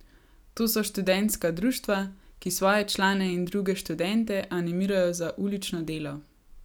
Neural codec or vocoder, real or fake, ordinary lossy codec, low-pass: none; real; none; none